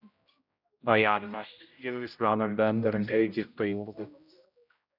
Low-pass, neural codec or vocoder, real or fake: 5.4 kHz; codec, 16 kHz, 0.5 kbps, X-Codec, HuBERT features, trained on general audio; fake